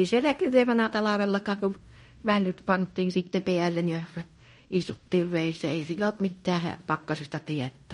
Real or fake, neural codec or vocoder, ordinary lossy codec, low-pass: fake; codec, 16 kHz in and 24 kHz out, 0.9 kbps, LongCat-Audio-Codec, fine tuned four codebook decoder; MP3, 48 kbps; 10.8 kHz